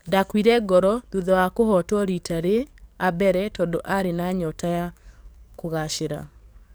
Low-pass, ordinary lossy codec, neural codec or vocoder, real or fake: none; none; codec, 44.1 kHz, 7.8 kbps, DAC; fake